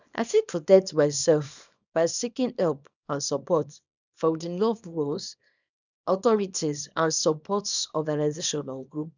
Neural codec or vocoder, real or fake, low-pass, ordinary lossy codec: codec, 24 kHz, 0.9 kbps, WavTokenizer, small release; fake; 7.2 kHz; none